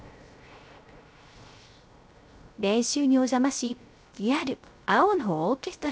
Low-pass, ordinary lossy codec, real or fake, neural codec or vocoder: none; none; fake; codec, 16 kHz, 0.3 kbps, FocalCodec